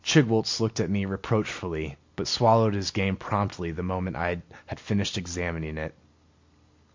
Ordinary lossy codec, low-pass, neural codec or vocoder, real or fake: MP3, 48 kbps; 7.2 kHz; none; real